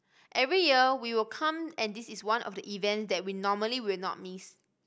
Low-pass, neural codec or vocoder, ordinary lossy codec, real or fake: none; none; none; real